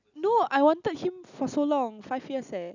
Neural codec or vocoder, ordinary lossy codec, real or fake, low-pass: none; none; real; 7.2 kHz